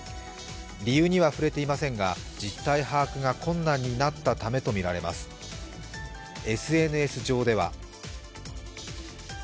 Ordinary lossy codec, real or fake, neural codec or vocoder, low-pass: none; real; none; none